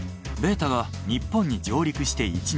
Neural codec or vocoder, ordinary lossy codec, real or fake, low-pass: none; none; real; none